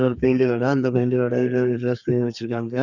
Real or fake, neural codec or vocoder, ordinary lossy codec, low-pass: fake; codec, 32 kHz, 1.9 kbps, SNAC; none; 7.2 kHz